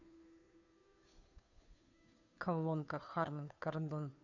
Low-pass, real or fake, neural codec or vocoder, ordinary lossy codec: 7.2 kHz; fake; codec, 16 kHz, 2 kbps, FunCodec, trained on Chinese and English, 25 frames a second; none